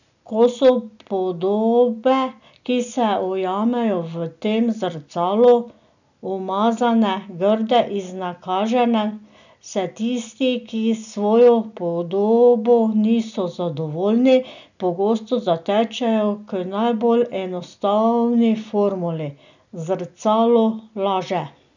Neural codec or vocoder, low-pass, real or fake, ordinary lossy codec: none; 7.2 kHz; real; none